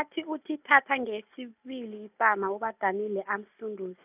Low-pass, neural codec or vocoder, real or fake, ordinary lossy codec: 3.6 kHz; none; real; none